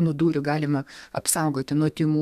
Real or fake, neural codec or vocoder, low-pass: fake; codec, 32 kHz, 1.9 kbps, SNAC; 14.4 kHz